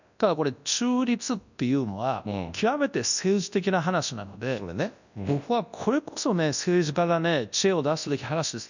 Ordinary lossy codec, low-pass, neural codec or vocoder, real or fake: none; 7.2 kHz; codec, 24 kHz, 0.9 kbps, WavTokenizer, large speech release; fake